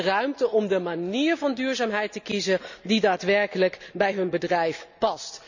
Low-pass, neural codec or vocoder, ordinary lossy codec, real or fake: 7.2 kHz; none; none; real